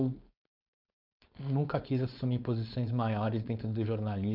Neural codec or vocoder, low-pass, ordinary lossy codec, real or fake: codec, 16 kHz, 4.8 kbps, FACodec; 5.4 kHz; none; fake